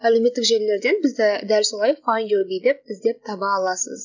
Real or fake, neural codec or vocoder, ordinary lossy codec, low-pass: real; none; none; 7.2 kHz